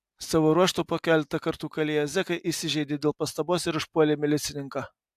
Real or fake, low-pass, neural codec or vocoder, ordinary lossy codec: real; 14.4 kHz; none; AAC, 96 kbps